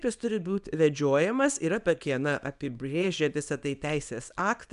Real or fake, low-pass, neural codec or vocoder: fake; 10.8 kHz; codec, 24 kHz, 0.9 kbps, WavTokenizer, small release